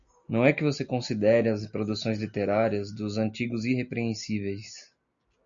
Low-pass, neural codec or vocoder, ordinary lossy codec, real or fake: 7.2 kHz; none; AAC, 64 kbps; real